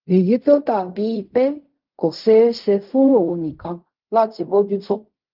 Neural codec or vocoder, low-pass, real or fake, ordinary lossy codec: codec, 16 kHz in and 24 kHz out, 0.4 kbps, LongCat-Audio-Codec, fine tuned four codebook decoder; 5.4 kHz; fake; Opus, 24 kbps